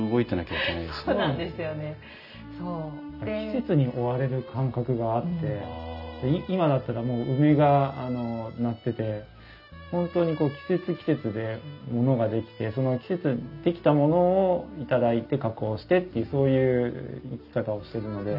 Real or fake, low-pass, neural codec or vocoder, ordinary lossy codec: real; 5.4 kHz; none; none